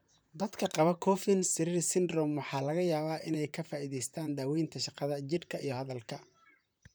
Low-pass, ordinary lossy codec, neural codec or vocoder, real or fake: none; none; vocoder, 44.1 kHz, 128 mel bands, Pupu-Vocoder; fake